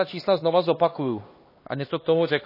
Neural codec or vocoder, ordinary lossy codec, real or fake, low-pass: codec, 16 kHz, 2 kbps, X-Codec, HuBERT features, trained on LibriSpeech; MP3, 24 kbps; fake; 5.4 kHz